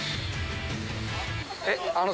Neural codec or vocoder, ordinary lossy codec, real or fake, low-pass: none; none; real; none